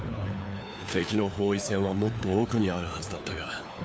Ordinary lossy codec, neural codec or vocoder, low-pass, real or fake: none; codec, 16 kHz, 4 kbps, FunCodec, trained on LibriTTS, 50 frames a second; none; fake